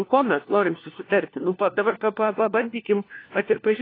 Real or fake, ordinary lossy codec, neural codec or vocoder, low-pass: fake; AAC, 24 kbps; codec, 16 kHz, 1 kbps, FunCodec, trained on LibriTTS, 50 frames a second; 5.4 kHz